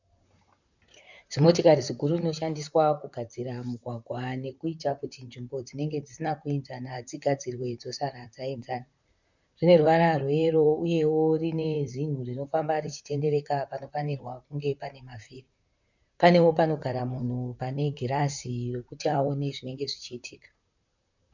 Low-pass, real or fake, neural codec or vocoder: 7.2 kHz; fake; vocoder, 44.1 kHz, 128 mel bands, Pupu-Vocoder